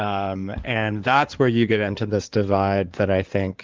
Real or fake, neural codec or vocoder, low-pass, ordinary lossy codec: fake; codec, 16 kHz in and 24 kHz out, 2.2 kbps, FireRedTTS-2 codec; 7.2 kHz; Opus, 32 kbps